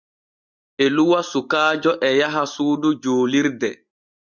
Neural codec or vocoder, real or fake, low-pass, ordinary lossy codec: none; real; 7.2 kHz; Opus, 64 kbps